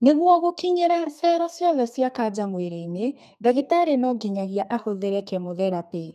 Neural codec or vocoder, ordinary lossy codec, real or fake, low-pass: codec, 32 kHz, 1.9 kbps, SNAC; none; fake; 14.4 kHz